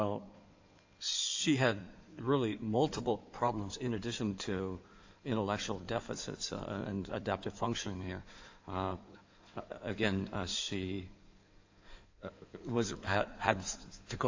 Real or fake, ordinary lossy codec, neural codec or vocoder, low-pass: fake; AAC, 48 kbps; codec, 16 kHz in and 24 kHz out, 2.2 kbps, FireRedTTS-2 codec; 7.2 kHz